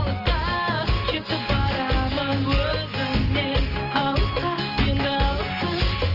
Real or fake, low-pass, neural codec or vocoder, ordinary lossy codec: real; 5.4 kHz; none; Opus, 24 kbps